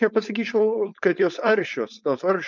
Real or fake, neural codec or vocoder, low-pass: fake; codec, 16 kHz, 4.8 kbps, FACodec; 7.2 kHz